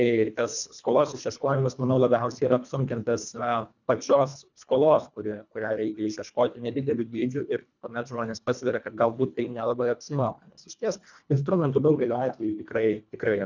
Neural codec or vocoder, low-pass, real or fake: codec, 24 kHz, 1.5 kbps, HILCodec; 7.2 kHz; fake